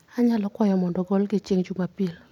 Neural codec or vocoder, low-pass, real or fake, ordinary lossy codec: none; 19.8 kHz; real; none